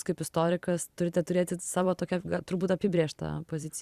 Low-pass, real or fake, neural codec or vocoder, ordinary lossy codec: 14.4 kHz; fake; vocoder, 44.1 kHz, 128 mel bands every 256 samples, BigVGAN v2; Opus, 64 kbps